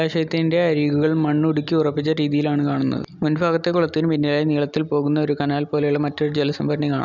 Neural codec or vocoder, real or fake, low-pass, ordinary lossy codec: none; real; 7.2 kHz; none